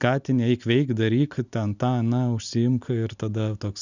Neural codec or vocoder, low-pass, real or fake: none; 7.2 kHz; real